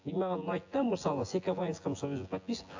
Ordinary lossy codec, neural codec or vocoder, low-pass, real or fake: AAC, 48 kbps; vocoder, 24 kHz, 100 mel bands, Vocos; 7.2 kHz; fake